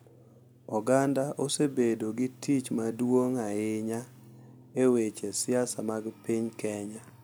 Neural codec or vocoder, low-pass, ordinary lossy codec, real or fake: none; none; none; real